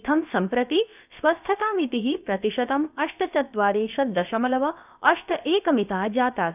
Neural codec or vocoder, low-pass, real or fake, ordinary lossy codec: codec, 16 kHz, about 1 kbps, DyCAST, with the encoder's durations; 3.6 kHz; fake; none